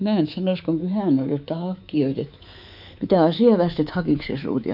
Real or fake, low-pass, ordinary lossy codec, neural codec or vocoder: fake; 5.4 kHz; AAC, 48 kbps; codec, 24 kHz, 3.1 kbps, DualCodec